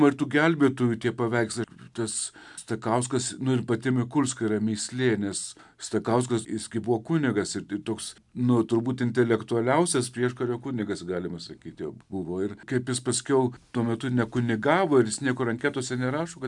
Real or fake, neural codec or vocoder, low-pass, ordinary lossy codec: real; none; 10.8 kHz; MP3, 96 kbps